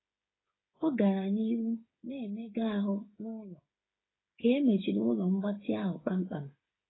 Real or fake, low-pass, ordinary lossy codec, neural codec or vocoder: fake; 7.2 kHz; AAC, 16 kbps; codec, 16 kHz, 8 kbps, FreqCodec, smaller model